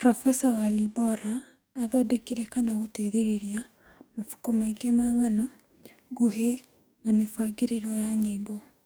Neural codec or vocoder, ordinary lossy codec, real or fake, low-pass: codec, 44.1 kHz, 2.6 kbps, DAC; none; fake; none